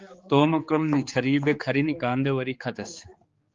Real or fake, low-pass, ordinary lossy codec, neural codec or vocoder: fake; 7.2 kHz; Opus, 32 kbps; codec, 16 kHz, 4 kbps, X-Codec, HuBERT features, trained on general audio